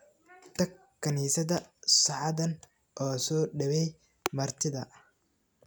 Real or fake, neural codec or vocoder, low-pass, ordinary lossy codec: real; none; none; none